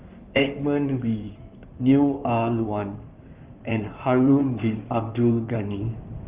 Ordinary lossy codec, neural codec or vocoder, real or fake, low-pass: Opus, 32 kbps; codec, 16 kHz in and 24 kHz out, 2.2 kbps, FireRedTTS-2 codec; fake; 3.6 kHz